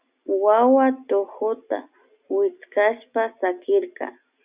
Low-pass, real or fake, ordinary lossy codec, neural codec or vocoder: 3.6 kHz; real; Opus, 64 kbps; none